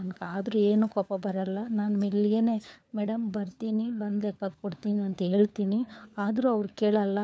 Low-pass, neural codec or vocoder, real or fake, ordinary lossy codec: none; codec, 16 kHz, 4 kbps, FunCodec, trained on LibriTTS, 50 frames a second; fake; none